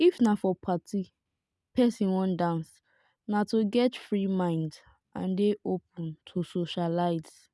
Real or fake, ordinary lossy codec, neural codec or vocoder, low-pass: real; none; none; none